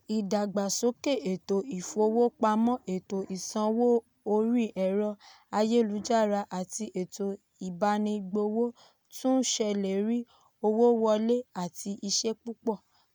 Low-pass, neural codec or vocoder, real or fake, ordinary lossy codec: none; none; real; none